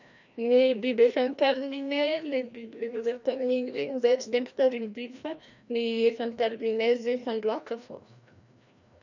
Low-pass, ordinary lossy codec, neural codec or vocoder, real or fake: 7.2 kHz; none; codec, 16 kHz, 1 kbps, FreqCodec, larger model; fake